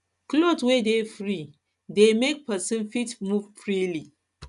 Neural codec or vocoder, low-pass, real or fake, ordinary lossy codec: none; 10.8 kHz; real; none